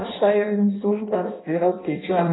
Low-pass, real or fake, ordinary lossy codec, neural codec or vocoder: 7.2 kHz; fake; AAC, 16 kbps; codec, 16 kHz in and 24 kHz out, 0.6 kbps, FireRedTTS-2 codec